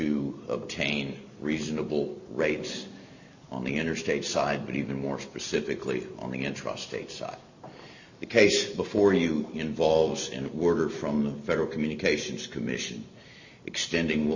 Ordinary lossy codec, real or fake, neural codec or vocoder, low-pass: Opus, 64 kbps; real; none; 7.2 kHz